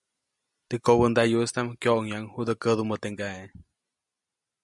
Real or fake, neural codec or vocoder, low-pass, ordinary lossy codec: real; none; 10.8 kHz; MP3, 96 kbps